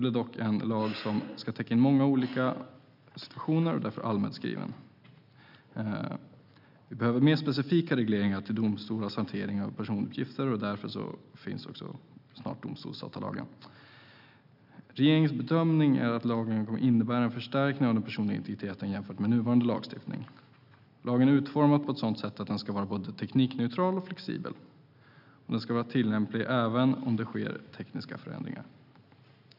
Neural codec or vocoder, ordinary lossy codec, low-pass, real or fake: none; none; 5.4 kHz; real